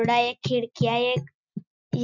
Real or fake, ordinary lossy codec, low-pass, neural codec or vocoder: real; none; 7.2 kHz; none